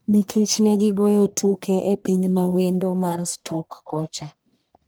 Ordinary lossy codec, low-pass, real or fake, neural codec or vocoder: none; none; fake; codec, 44.1 kHz, 1.7 kbps, Pupu-Codec